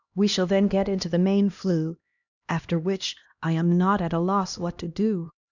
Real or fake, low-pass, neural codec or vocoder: fake; 7.2 kHz; codec, 16 kHz, 1 kbps, X-Codec, HuBERT features, trained on LibriSpeech